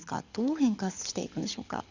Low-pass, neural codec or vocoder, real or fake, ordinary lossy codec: 7.2 kHz; codec, 24 kHz, 6 kbps, HILCodec; fake; Opus, 64 kbps